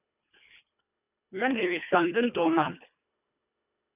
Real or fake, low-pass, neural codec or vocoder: fake; 3.6 kHz; codec, 24 kHz, 1.5 kbps, HILCodec